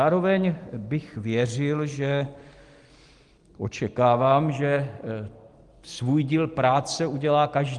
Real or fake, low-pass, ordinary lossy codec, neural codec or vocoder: real; 10.8 kHz; Opus, 24 kbps; none